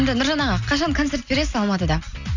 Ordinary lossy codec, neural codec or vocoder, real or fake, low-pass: none; none; real; 7.2 kHz